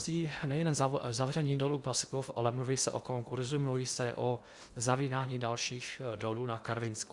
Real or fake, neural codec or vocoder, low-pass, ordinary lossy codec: fake; codec, 16 kHz in and 24 kHz out, 0.8 kbps, FocalCodec, streaming, 65536 codes; 10.8 kHz; Opus, 64 kbps